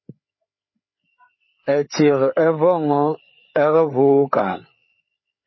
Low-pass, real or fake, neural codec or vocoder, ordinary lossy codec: 7.2 kHz; fake; codec, 16 kHz, 4 kbps, FreqCodec, larger model; MP3, 24 kbps